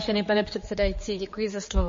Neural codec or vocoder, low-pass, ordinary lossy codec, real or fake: codec, 16 kHz, 4 kbps, X-Codec, HuBERT features, trained on general audio; 7.2 kHz; MP3, 32 kbps; fake